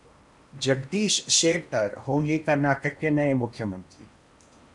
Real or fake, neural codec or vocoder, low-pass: fake; codec, 16 kHz in and 24 kHz out, 0.8 kbps, FocalCodec, streaming, 65536 codes; 10.8 kHz